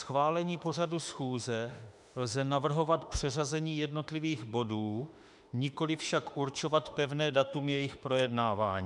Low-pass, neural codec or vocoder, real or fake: 10.8 kHz; autoencoder, 48 kHz, 32 numbers a frame, DAC-VAE, trained on Japanese speech; fake